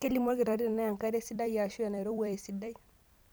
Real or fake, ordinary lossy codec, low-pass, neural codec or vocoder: fake; none; none; vocoder, 44.1 kHz, 128 mel bands every 256 samples, BigVGAN v2